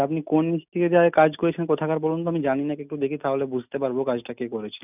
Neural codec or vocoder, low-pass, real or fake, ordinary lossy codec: none; 3.6 kHz; real; none